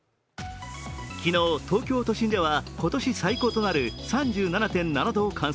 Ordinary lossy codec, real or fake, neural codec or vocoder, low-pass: none; real; none; none